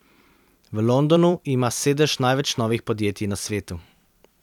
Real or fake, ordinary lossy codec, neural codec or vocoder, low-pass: fake; none; vocoder, 44.1 kHz, 128 mel bands every 256 samples, BigVGAN v2; 19.8 kHz